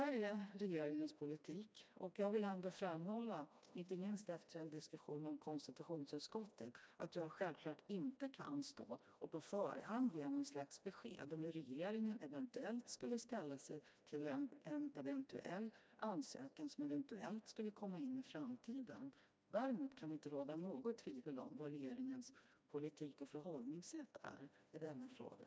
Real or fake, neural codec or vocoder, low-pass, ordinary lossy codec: fake; codec, 16 kHz, 1 kbps, FreqCodec, smaller model; none; none